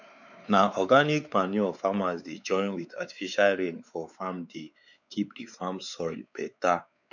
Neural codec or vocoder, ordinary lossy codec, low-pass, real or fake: codec, 16 kHz, 4 kbps, X-Codec, WavLM features, trained on Multilingual LibriSpeech; none; 7.2 kHz; fake